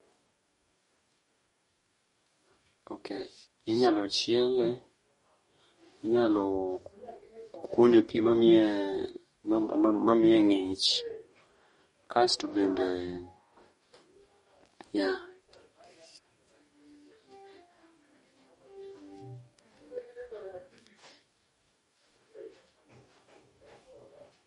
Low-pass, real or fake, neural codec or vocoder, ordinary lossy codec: 19.8 kHz; fake; codec, 44.1 kHz, 2.6 kbps, DAC; MP3, 48 kbps